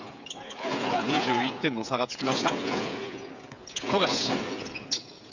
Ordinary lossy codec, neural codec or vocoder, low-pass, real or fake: none; codec, 16 kHz, 8 kbps, FreqCodec, smaller model; 7.2 kHz; fake